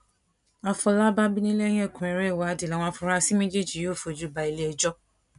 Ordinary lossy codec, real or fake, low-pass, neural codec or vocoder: none; real; 10.8 kHz; none